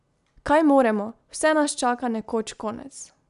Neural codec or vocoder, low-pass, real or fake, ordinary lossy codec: none; 10.8 kHz; real; none